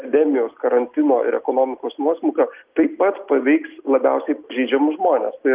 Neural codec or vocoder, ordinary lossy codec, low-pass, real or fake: none; Opus, 32 kbps; 3.6 kHz; real